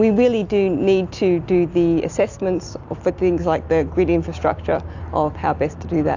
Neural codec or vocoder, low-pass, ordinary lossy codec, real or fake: none; 7.2 kHz; AAC, 48 kbps; real